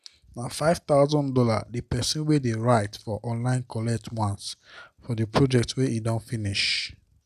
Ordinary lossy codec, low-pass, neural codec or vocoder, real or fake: none; 14.4 kHz; none; real